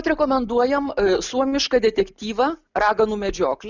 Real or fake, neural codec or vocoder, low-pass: real; none; 7.2 kHz